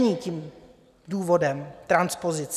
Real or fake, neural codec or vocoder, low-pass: real; none; 14.4 kHz